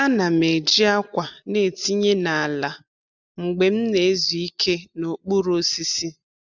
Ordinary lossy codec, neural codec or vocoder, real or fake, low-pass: none; none; real; 7.2 kHz